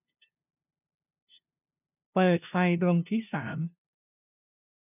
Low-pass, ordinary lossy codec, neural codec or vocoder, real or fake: 3.6 kHz; none; codec, 16 kHz, 0.5 kbps, FunCodec, trained on LibriTTS, 25 frames a second; fake